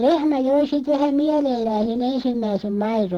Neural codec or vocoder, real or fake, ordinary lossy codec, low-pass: vocoder, 48 kHz, 128 mel bands, Vocos; fake; Opus, 16 kbps; 19.8 kHz